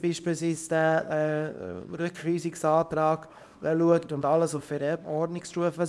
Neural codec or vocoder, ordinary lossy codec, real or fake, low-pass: codec, 24 kHz, 0.9 kbps, WavTokenizer, small release; none; fake; none